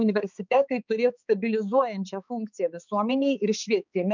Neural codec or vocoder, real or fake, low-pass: codec, 16 kHz, 4 kbps, X-Codec, HuBERT features, trained on balanced general audio; fake; 7.2 kHz